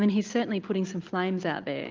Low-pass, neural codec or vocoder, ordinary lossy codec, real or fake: 7.2 kHz; none; Opus, 24 kbps; real